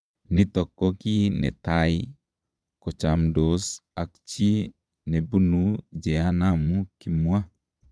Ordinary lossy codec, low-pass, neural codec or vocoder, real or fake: none; none; vocoder, 22.05 kHz, 80 mel bands, Vocos; fake